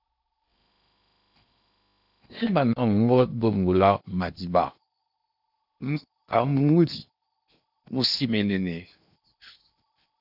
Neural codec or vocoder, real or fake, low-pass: codec, 16 kHz in and 24 kHz out, 0.8 kbps, FocalCodec, streaming, 65536 codes; fake; 5.4 kHz